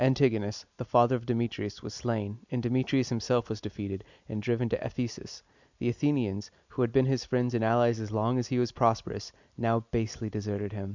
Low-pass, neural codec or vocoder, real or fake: 7.2 kHz; none; real